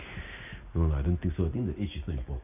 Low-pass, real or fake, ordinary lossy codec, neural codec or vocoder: 3.6 kHz; real; none; none